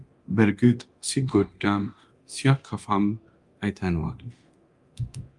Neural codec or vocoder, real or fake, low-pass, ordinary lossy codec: codec, 24 kHz, 0.9 kbps, DualCodec; fake; 10.8 kHz; Opus, 32 kbps